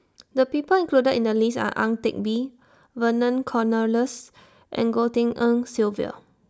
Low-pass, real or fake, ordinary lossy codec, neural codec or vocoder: none; real; none; none